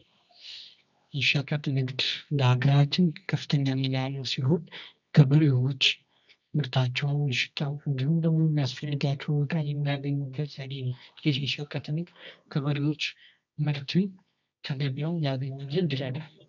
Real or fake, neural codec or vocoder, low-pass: fake; codec, 24 kHz, 0.9 kbps, WavTokenizer, medium music audio release; 7.2 kHz